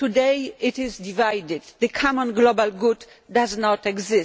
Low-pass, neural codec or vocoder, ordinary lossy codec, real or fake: none; none; none; real